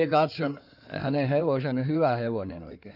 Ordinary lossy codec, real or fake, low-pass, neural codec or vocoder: none; fake; 5.4 kHz; codec, 16 kHz in and 24 kHz out, 2.2 kbps, FireRedTTS-2 codec